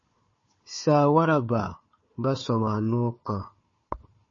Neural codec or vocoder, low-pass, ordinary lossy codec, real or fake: codec, 16 kHz, 4 kbps, FunCodec, trained on Chinese and English, 50 frames a second; 7.2 kHz; MP3, 32 kbps; fake